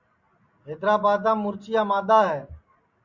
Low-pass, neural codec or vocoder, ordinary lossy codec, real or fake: 7.2 kHz; none; Opus, 64 kbps; real